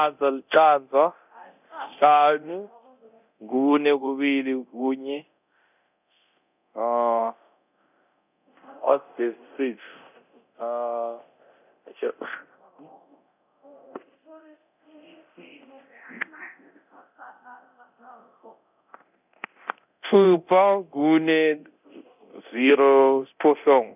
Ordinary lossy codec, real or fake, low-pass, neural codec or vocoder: none; fake; 3.6 kHz; codec, 24 kHz, 0.9 kbps, DualCodec